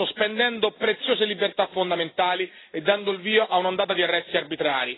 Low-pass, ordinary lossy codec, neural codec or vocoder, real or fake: 7.2 kHz; AAC, 16 kbps; none; real